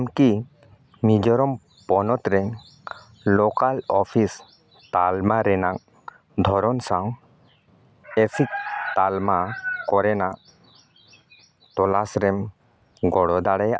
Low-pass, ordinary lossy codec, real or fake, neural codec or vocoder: none; none; real; none